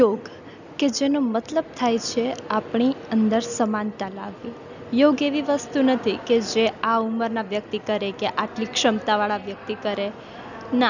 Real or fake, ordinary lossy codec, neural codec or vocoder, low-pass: real; none; none; 7.2 kHz